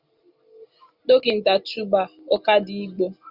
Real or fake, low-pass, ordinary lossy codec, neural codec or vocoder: real; 5.4 kHz; Opus, 64 kbps; none